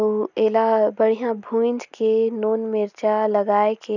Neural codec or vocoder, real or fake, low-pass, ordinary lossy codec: none; real; 7.2 kHz; none